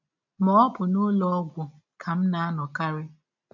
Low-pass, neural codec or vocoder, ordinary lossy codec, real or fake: 7.2 kHz; none; none; real